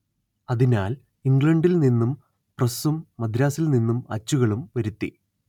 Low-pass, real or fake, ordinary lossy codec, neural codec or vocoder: 19.8 kHz; real; none; none